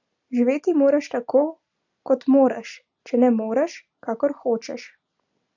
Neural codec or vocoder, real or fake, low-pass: none; real; 7.2 kHz